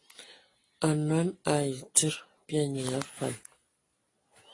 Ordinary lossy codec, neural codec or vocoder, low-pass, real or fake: AAC, 32 kbps; none; 10.8 kHz; real